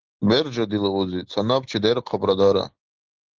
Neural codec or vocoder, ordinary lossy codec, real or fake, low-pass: none; Opus, 16 kbps; real; 7.2 kHz